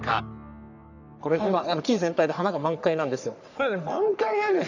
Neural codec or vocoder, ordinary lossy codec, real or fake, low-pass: codec, 44.1 kHz, 3.4 kbps, Pupu-Codec; none; fake; 7.2 kHz